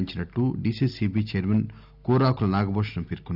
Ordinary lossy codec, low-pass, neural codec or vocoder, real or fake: none; 5.4 kHz; none; real